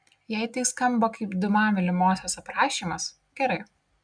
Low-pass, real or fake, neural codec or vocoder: 9.9 kHz; real; none